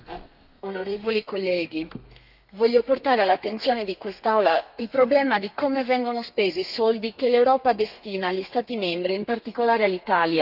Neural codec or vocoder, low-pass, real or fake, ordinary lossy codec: codec, 32 kHz, 1.9 kbps, SNAC; 5.4 kHz; fake; none